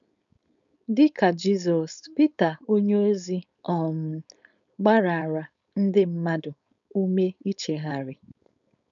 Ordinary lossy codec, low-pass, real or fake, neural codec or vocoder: none; 7.2 kHz; fake; codec, 16 kHz, 4.8 kbps, FACodec